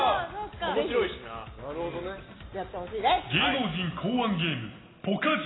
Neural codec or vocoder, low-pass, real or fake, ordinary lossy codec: none; 7.2 kHz; real; AAC, 16 kbps